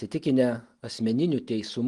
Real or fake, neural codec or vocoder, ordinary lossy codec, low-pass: real; none; Opus, 24 kbps; 10.8 kHz